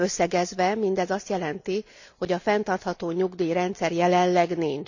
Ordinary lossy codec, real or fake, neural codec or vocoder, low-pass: none; real; none; 7.2 kHz